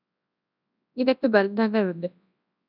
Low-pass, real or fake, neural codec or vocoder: 5.4 kHz; fake; codec, 24 kHz, 0.9 kbps, WavTokenizer, large speech release